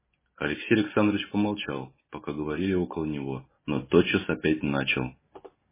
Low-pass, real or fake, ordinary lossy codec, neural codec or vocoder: 3.6 kHz; real; MP3, 16 kbps; none